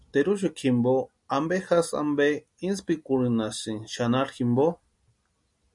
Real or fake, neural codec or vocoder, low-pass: real; none; 10.8 kHz